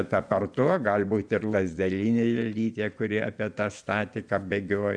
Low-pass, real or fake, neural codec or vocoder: 9.9 kHz; fake; vocoder, 24 kHz, 100 mel bands, Vocos